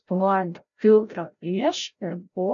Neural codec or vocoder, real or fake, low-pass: codec, 16 kHz, 0.5 kbps, FreqCodec, larger model; fake; 7.2 kHz